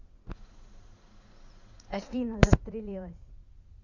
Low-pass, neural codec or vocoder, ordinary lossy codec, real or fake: 7.2 kHz; vocoder, 44.1 kHz, 80 mel bands, Vocos; Opus, 64 kbps; fake